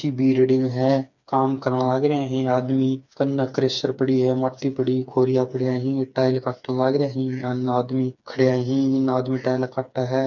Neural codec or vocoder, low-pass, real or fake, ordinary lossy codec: codec, 16 kHz, 4 kbps, FreqCodec, smaller model; 7.2 kHz; fake; none